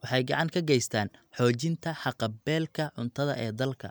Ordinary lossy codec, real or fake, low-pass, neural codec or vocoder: none; real; none; none